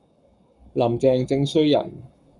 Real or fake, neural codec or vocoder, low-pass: fake; codec, 44.1 kHz, 7.8 kbps, Pupu-Codec; 10.8 kHz